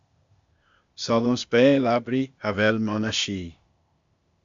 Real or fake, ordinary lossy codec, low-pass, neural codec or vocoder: fake; MP3, 64 kbps; 7.2 kHz; codec, 16 kHz, 0.8 kbps, ZipCodec